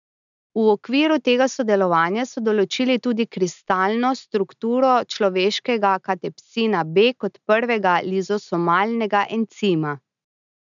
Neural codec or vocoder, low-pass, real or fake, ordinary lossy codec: none; 7.2 kHz; real; none